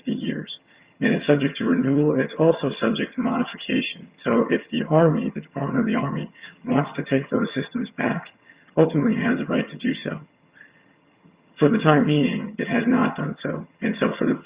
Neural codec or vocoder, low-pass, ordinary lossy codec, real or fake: vocoder, 22.05 kHz, 80 mel bands, HiFi-GAN; 3.6 kHz; Opus, 64 kbps; fake